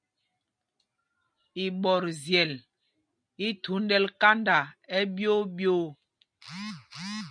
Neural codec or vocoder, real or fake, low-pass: none; real; 9.9 kHz